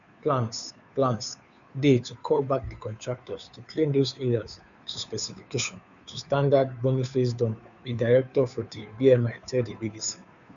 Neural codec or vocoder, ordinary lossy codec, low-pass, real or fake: codec, 16 kHz, 2 kbps, FunCodec, trained on Chinese and English, 25 frames a second; none; 7.2 kHz; fake